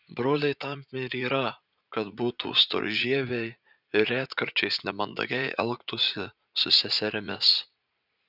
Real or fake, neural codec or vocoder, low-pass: fake; vocoder, 44.1 kHz, 80 mel bands, Vocos; 5.4 kHz